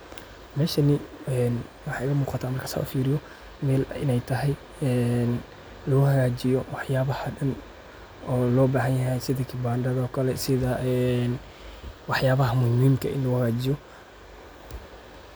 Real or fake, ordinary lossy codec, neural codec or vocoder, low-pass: real; none; none; none